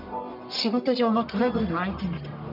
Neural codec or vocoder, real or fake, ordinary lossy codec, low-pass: codec, 44.1 kHz, 1.7 kbps, Pupu-Codec; fake; none; 5.4 kHz